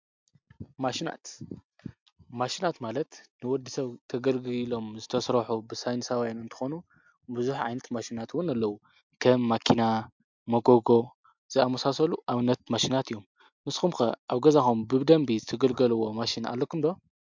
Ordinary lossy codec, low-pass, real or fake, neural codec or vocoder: MP3, 64 kbps; 7.2 kHz; real; none